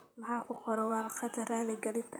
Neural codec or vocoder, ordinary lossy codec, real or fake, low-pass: vocoder, 44.1 kHz, 128 mel bands, Pupu-Vocoder; none; fake; none